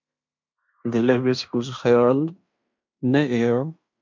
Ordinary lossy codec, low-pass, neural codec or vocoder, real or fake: MP3, 64 kbps; 7.2 kHz; codec, 16 kHz in and 24 kHz out, 0.9 kbps, LongCat-Audio-Codec, fine tuned four codebook decoder; fake